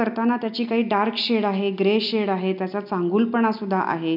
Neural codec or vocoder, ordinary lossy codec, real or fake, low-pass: none; none; real; 5.4 kHz